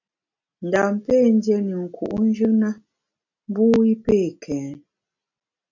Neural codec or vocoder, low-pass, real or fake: none; 7.2 kHz; real